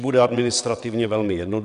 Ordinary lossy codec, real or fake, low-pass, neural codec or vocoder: AAC, 96 kbps; fake; 9.9 kHz; vocoder, 22.05 kHz, 80 mel bands, WaveNeXt